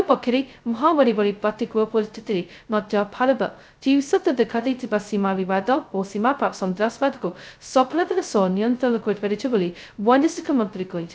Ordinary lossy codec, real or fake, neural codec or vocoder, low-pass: none; fake; codec, 16 kHz, 0.2 kbps, FocalCodec; none